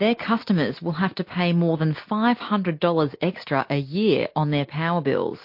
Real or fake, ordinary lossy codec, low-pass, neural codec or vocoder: real; MP3, 32 kbps; 5.4 kHz; none